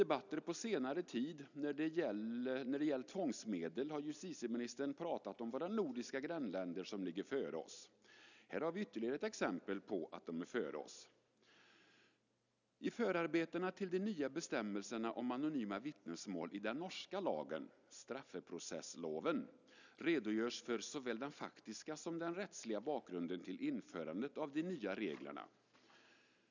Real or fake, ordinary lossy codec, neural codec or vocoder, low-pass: real; none; none; 7.2 kHz